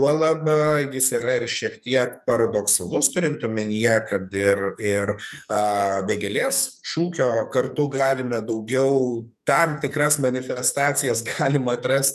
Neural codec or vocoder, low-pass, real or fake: codec, 44.1 kHz, 2.6 kbps, SNAC; 14.4 kHz; fake